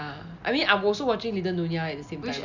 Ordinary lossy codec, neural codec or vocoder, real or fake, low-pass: none; none; real; 7.2 kHz